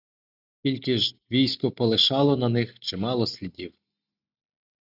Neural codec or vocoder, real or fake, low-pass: none; real; 5.4 kHz